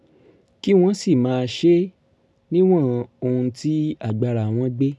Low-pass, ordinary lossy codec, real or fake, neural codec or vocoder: none; none; real; none